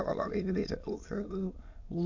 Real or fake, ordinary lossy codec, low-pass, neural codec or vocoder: fake; AAC, 48 kbps; 7.2 kHz; autoencoder, 22.05 kHz, a latent of 192 numbers a frame, VITS, trained on many speakers